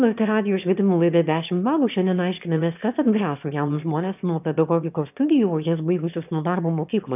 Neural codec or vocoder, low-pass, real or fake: autoencoder, 22.05 kHz, a latent of 192 numbers a frame, VITS, trained on one speaker; 3.6 kHz; fake